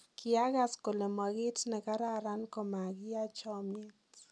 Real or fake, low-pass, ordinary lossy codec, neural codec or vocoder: real; none; none; none